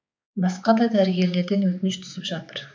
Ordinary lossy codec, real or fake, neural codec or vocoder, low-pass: none; fake; codec, 16 kHz, 4 kbps, X-Codec, HuBERT features, trained on balanced general audio; none